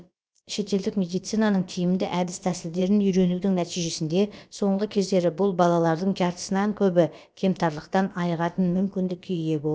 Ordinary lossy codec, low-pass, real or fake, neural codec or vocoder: none; none; fake; codec, 16 kHz, about 1 kbps, DyCAST, with the encoder's durations